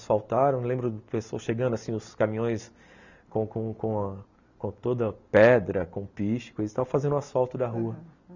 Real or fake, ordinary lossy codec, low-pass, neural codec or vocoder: real; none; 7.2 kHz; none